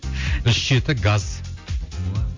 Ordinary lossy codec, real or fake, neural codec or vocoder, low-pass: MP3, 48 kbps; real; none; 7.2 kHz